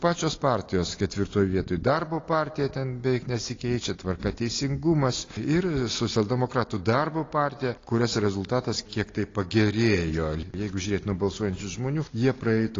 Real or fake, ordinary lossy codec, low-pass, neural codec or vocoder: real; AAC, 32 kbps; 7.2 kHz; none